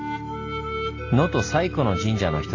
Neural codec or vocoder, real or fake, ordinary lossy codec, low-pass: none; real; AAC, 32 kbps; 7.2 kHz